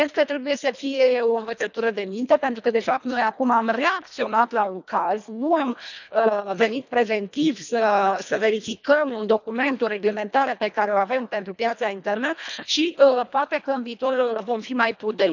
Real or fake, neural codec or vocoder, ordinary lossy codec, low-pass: fake; codec, 24 kHz, 1.5 kbps, HILCodec; none; 7.2 kHz